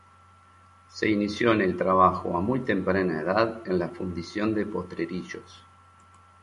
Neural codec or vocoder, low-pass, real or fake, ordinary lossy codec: none; 14.4 kHz; real; MP3, 48 kbps